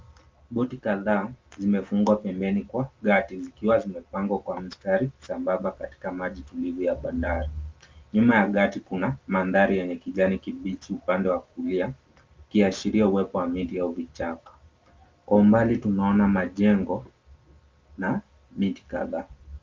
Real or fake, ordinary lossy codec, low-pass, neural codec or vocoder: real; Opus, 24 kbps; 7.2 kHz; none